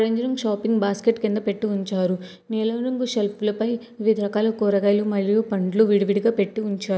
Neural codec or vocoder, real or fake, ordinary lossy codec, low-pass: none; real; none; none